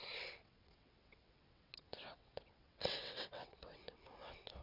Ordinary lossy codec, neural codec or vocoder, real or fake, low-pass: MP3, 48 kbps; vocoder, 22.05 kHz, 80 mel bands, WaveNeXt; fake; 5.4 kHz